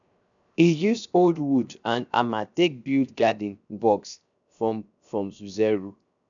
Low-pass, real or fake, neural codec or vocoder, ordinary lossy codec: 7.2 kHz; fake; codec, 16 kHz, 0.7 kbps, FocalCodec; none